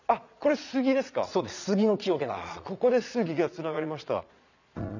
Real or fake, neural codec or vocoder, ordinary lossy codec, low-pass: fake; vocoder, 22.05 kHz, 80 mel bands, Vocos; none; 7.2 kHz